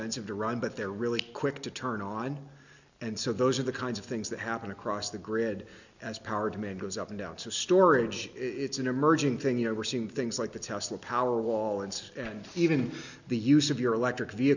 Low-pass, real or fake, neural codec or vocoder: 7.2 kHz; real; none